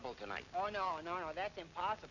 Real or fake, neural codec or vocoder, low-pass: real; none; 7.2 kHz